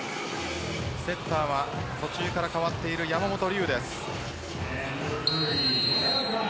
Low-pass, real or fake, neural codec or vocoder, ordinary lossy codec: none; real; none; none